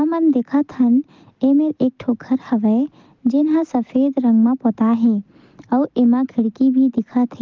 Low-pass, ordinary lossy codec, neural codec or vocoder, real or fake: 7.2 kHz; Opus, 32 kbps; none; real